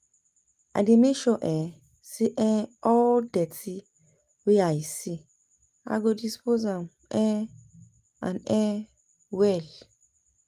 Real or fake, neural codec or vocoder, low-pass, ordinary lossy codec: real; none; 14.4 kHz; Opus, 32 kbps